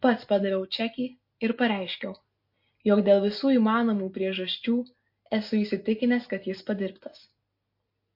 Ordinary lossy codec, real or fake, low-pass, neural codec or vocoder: MP3, 32 kbps; real; 5.4 kHz; none